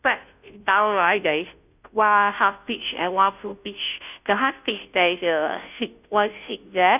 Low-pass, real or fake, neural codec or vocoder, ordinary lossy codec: 3.6 kHz; fake; codec, 16 kHz, 0.5 kbps, FunCodec, trained on Chinese and English, 25 frames a second; none